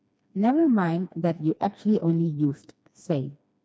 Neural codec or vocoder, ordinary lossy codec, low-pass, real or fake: codec, 16 kHz, 2 kbps, FreqCodec, smaller model; none; none; fake